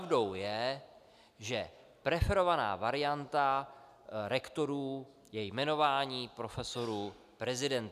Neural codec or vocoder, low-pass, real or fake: none; 14.4 kHz; real